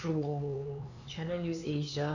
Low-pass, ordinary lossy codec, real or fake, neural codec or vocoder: 7.2 kHz; none; fake; codec, 16 kHz, 4 kbps, X-Codec, HuBERT features, trained on LibriSpeech